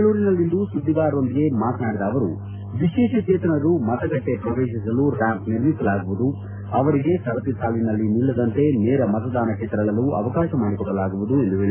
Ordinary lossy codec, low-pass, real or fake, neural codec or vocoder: AAC, 24 kbps; 3.6 kHz; real; none